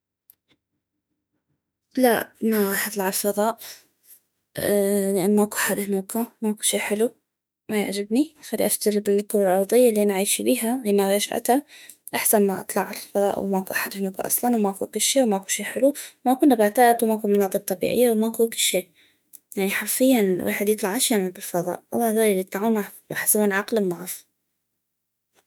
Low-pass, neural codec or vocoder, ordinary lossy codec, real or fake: none; autoencoder, 48 kHz, 32 numbers a frame, DAC-VAE, trained on Japanese speech; none; fake